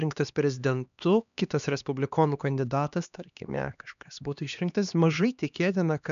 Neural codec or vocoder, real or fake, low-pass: codec, 16 kHz, 2 kbps, X-Codec, HuBERT features, trained on LibriSpeech; fake; 7.2 kHz